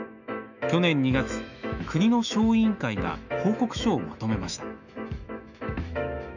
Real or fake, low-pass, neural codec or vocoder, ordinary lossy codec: fake; 7.2 kHz; autoencoder, 48 kHz, 128 numbers a frame, DAC-VAE, trained on Japanese speech; none